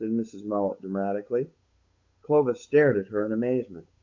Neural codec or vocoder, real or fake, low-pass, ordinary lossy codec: codec, 16 kHz, 8 kbps, FunCodec, trained on Chinese and English, 25 frames a second; fake; 7.2 kHz; MP3, 48 kbps